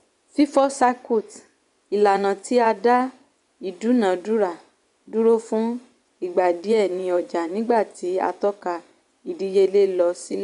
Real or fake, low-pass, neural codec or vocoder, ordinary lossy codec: fake; 10.8 kHz; vocoder, 24 kHz, 100 mel bands, Vocos; none